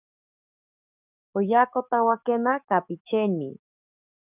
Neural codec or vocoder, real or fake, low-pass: codec, 44.1 kHz, 7.8 kbps, DAC; fake; 3.6 kHz